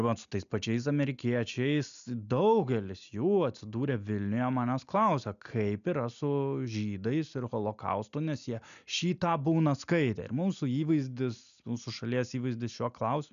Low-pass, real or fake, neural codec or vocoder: 7.2 kHz; real; none